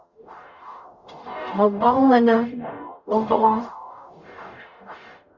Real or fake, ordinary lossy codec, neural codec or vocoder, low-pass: fake; Opus, 64 kbps; codec, 44.1 kHz, 0.9 kbps, DAC; 7.2 kHz